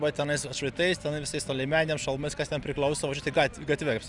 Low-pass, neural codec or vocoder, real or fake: 10.8 kHz; none; real